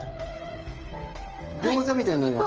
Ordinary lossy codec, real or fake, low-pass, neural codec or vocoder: Opus, 24 kbps; fake; 7.2 kHz; codec, 16 kHz, 8 kbps, FreqCodec, smaller model